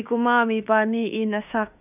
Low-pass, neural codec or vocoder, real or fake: 3.6 kHz; autoencoder, 48 kHz, 32 numbers a frame, DAC-VAE, trained on Japanese speech; fake